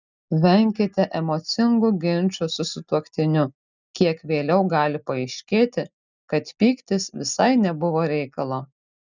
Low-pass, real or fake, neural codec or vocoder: 7.2 kHz; real; none